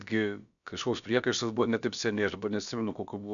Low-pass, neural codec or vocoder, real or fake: 7.2 kHz; codec, 16 kHz, about 1 kbps, DyCAST, with the encoder's durations; fake